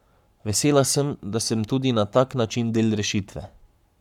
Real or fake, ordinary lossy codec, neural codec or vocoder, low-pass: fake; none; codec, 44.1 kHz, 7.8 kbps, Pupu-Codec; 19.8 kHz